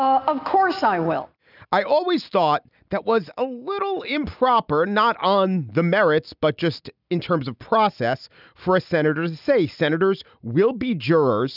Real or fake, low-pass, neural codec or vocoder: real; 5.4 kHz; none